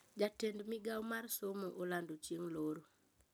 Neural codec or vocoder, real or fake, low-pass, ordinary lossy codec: none; real; none; none